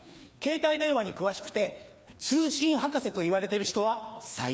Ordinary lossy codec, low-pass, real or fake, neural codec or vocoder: none; none; fake; codec, 16 kHz, 2 kbps, FreqCodec, larger model